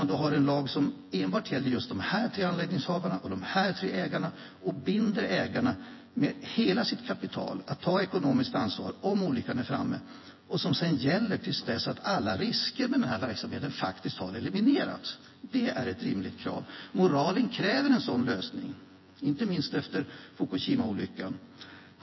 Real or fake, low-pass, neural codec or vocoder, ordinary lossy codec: fake; 7.2 kHz; vocoder, 24 kHz, 100 mel bands, Vocos; MP3, 24 kbps